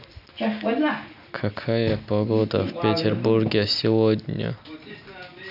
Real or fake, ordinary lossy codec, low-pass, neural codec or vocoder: real; none; 5.4 kHz; none